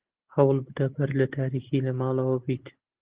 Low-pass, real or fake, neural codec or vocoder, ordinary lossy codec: 3.6 kHz; real; none; Opus, 16 kbps